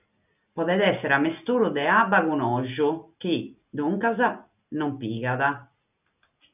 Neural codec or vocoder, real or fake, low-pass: none; real; 3.6 kHz